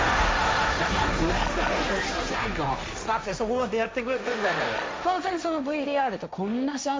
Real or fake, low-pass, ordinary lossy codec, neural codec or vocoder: fake; none; none; codec, 16 kHz, 1.1 kbps, Voila-Tokenizer